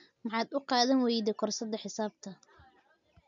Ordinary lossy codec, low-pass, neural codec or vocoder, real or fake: none; 7.2 kHz; none; real